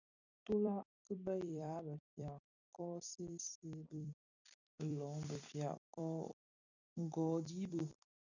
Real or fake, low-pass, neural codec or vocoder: fake; 7.2 kHz; vocoder, 44.1 kHz, 128 mel bands every 256 samples, BigVGAN v2